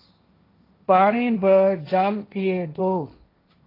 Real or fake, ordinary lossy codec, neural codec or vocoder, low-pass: fake; AAC, 24 kbps; codec, 16 kHz, 1.1 kbps, Voila-Tokenizer; 5.4 kHz